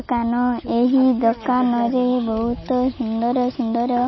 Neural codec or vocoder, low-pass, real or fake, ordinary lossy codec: none; 7.2 kHz; real; MP3, 24 kbps